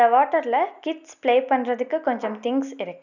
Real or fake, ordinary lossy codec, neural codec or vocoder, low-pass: real; none; none; 7.2 kHz